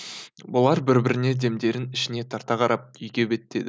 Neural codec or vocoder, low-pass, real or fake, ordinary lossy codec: none; none; real; none